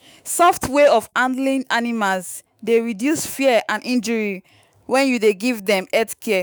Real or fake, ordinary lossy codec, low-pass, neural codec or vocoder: fake; none; none; autoencoder, 48 kHz, 128 numbers a frame, DAC-VAE, trained on Japanese speech